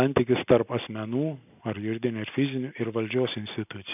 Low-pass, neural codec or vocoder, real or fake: 3.6 kHz; none; real